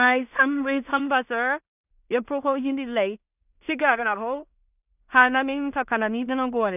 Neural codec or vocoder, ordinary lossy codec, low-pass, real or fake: codec, 16 kHz in and 24 kHz out, 0.4 kbps, LongCat-Audio-Codec, two codebook decoder; none; 3.6 kHz; fake